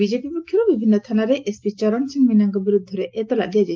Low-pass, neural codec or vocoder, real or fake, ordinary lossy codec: 7.2 kHz; none; real; Opus, 32 kbps